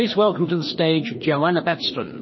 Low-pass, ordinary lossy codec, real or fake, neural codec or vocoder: 7.2 kHz; MP3, 24 kbps; fake; codec, 16 kHz, 2 kbps, FreqCodec, larger model